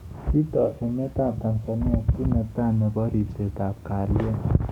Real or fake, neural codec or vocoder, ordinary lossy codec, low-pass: fake; codec, 44.1 kHz, 7.8 kbps, DAC; none; 19.8 kHz